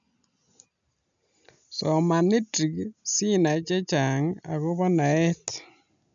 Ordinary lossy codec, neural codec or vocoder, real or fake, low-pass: none; none; real; 7.2 kHz